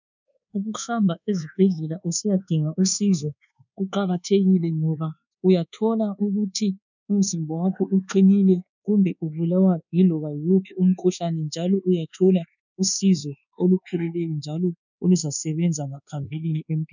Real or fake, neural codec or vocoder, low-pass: fake; codec, 24 kHz, 1.2 kbps, DualCodec; 7.2 kHz